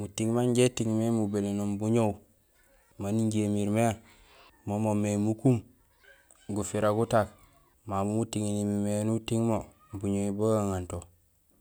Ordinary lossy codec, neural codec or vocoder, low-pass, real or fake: none; none; none; real